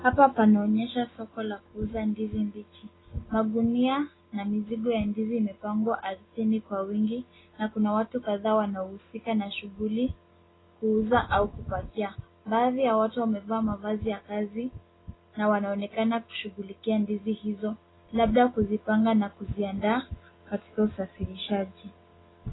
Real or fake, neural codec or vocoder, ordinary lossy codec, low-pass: real; none; AAC, 16 kbps; 7.2 kHz